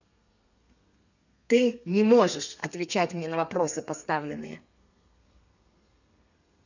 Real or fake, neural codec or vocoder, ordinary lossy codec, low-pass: fake; codec, 32 kHz, 1.9 kbps, SNAC; none; 7.2 kHz